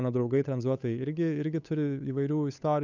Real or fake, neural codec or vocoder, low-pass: fake; codec, 16 kHz, 8 kbps, FunCodec, trained on Chinese and English, 25 frames a second; 7.2 kHz